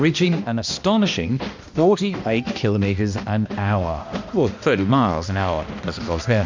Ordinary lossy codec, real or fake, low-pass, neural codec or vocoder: MP3, 48 kbps; fake; 7.2 kHz; codec, 16 kHz, 1 kbps, X-Codec, HuBERT features, trained on balanced general audio